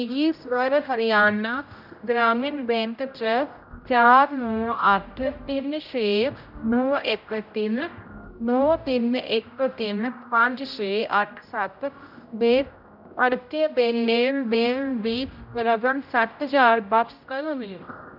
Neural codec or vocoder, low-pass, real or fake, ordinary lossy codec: codec, 16 kHz, 0.5 kbps, X-Codec, HuBERT features, trained on general audio; 5.4 kHz; fake; none